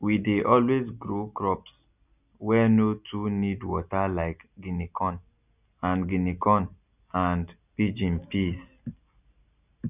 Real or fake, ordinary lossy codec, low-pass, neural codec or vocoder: real; none; 3.6 kHz; none